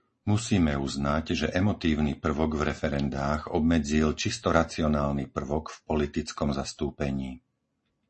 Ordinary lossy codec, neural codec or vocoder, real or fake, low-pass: MP3, 32 kbps; none; real; 10.8 kHz